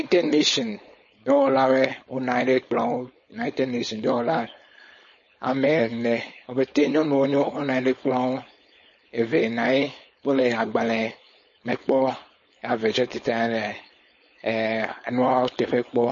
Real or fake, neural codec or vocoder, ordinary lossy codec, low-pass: fake; codec, 16 kHz, 4.8 kbps, FACodec; MP3, 32 kbps; 7.2 kHz